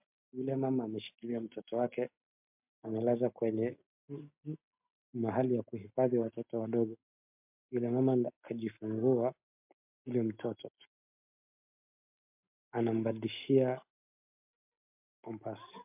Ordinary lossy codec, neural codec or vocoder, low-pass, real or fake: MP3, 32 kbps; none; 3.6 kHz; real